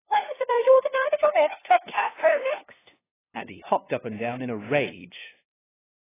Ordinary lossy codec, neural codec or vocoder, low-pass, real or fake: AAC, 16 kbps; codec, 16 kHz, 2 kbps, FunCodec, trained on LibriTTS, 25 frames a second; 3.6 kHz; fake